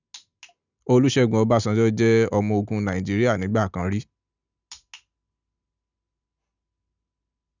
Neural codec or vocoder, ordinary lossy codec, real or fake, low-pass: none; none; real; 7.2 kHz